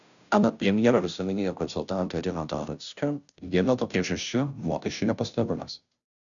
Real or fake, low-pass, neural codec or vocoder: fake; 7.2 kHz; codec, 16 kHz, 0.5 kbps, FunCodec, trained on Chinese and English, 25 frames a second